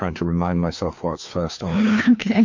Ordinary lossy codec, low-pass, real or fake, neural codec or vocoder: MP3, 48 kbps; 7.2 kHz; fake; codec, 16 kHz, 2 kbps, FreqCodec, larger model